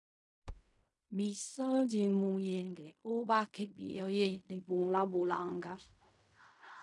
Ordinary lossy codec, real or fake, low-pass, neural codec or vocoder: MP3, 96 kbps; fake; 10.8 kHz; codec, 16 kHz in and 24 kHz out, 0.4 kbps, LongCat-Audio-Codec, fine tuned four codebook decoder